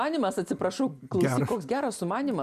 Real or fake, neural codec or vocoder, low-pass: real; none; 14.4 kHz